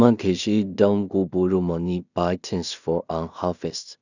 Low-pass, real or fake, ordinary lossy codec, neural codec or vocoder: 7.2 kHz; fake; none; codec, 16 kHz in and 24 kHz out, 0.4 kbps, LongCat-Audio-Codec, two codebook decoder